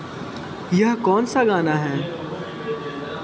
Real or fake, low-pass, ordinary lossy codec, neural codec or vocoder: real; none; none; none